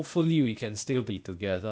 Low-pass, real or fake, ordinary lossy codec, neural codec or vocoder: none; fake; none; codec, 16 kHz, 0.8 kbps, ZipCodec